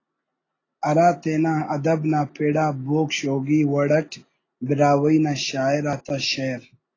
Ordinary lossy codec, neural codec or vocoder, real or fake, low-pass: AAC, 32 kbps; none; real; 7.2 kHz